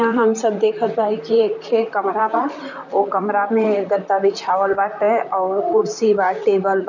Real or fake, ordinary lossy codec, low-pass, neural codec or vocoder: fake; none; 7.2 kHz; vocoder, 44.1 kHz, 128 mel bands, Pupu-Vocoder